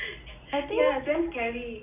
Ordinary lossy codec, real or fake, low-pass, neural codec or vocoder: none; real; 3.6 kHz; none